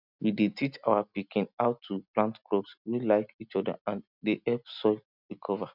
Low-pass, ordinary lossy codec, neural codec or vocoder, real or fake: 5.4 kHz; none; none; real